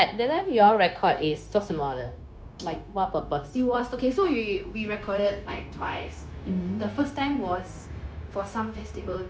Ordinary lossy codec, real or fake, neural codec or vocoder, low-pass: none; fake; codec, 16 kHz, 0.9 kbps, LongCat-Audio-Codec; none